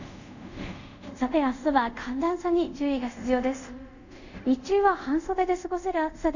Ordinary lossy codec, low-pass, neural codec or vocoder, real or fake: none; 7.2 kHz; codec, 24 kHz, 0.5 kbps, DualCodec; fake